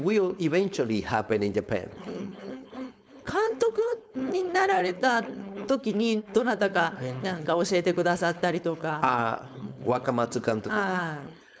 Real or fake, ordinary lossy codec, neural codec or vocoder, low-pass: fake; none; codec, 16 kHz, 4.8 kbps, FACodec; none